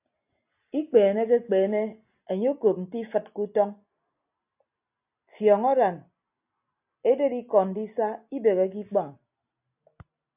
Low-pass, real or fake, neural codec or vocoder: 3.6 kHz; real; none